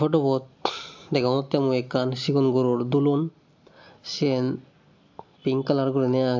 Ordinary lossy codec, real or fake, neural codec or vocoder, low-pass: AAC, 48 kbps; real; none; 7.2 kHz